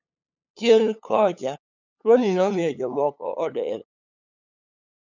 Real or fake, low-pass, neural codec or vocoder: fake; 7.2 kHz; codec, 16 kHz, 8 kbps, FunCodec, trained on LibriTTS, 25 frames a second